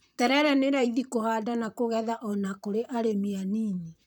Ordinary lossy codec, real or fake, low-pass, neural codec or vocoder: none; fake; none; vocoder, 44.1 kHz, 128 mel bands, Pupu-Vocoder